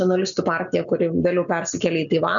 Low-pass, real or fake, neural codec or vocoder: 7.2 kHz; real; none